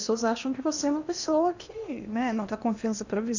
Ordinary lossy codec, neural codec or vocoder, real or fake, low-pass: none; codec, 16 kHz in and 24 kHz out, 0.8 kbps, FocalCodec, streaming, 65536 codes; fake; 7.2 kHz